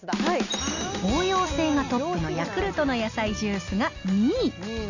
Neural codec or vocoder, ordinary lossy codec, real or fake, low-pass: none; none; real; 7.2 kHz